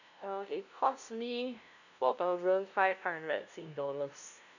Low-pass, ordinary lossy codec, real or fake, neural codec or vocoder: 7.2 kHz; none; fake; codec, 16 kHz, 0.5 kbps, FunCodec, trained on LibriTTS, 25 frames a second